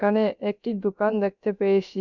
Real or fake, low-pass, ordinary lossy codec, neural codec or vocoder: fake; 7.2 kHz; none; codec, 16 kHz, 0.3 kbps, FocalCodec